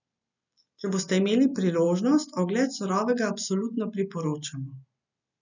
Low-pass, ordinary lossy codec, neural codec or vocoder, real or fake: 7.2 kHz; none; none; real